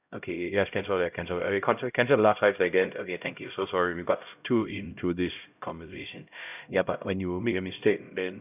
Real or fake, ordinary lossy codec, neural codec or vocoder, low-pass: fake; none; codec, 16 kHz, 0.5 kbps, X-Codec, HuBERT features, trained on LibriSpeech; 3.6 kHz